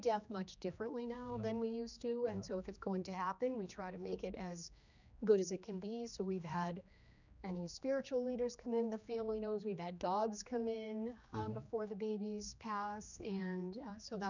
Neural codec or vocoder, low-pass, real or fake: codec, 16 kHz, 2 kbps, X-Codec, HuBERT features, trained on general audio; 7.2 kHz; fake